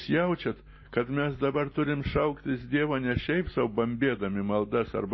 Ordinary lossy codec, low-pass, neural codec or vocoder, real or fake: MP3, 24 kbps; 7.2 kHz; none; real